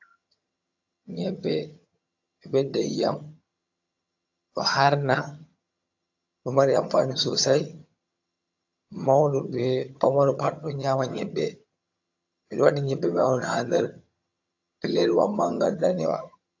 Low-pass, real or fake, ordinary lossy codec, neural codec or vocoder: 7.2 kHz; fake; AAC, 48 kbps; vocoder, 22.05 kHz, 80 mel bands, HiFi-GAN